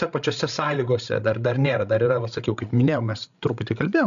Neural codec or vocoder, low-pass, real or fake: codec, 16 kHz, 16 kbps, FreqCodec, larger model; 7.2 kHz; fake